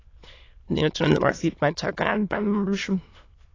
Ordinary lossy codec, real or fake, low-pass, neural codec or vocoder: AAC, 32 kbps; fake; 7.2 kHz; autoencoder, 22.05 kHz, a latent of 192 numbers a frame, VITS, trained on many speakers